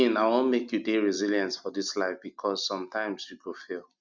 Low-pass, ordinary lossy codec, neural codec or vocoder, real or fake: 7.2 kHz; Opus, 64 kbps; none; real